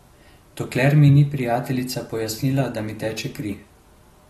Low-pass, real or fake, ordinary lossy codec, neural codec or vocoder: 19.8 kHz; real; AAC, 32 kbps; none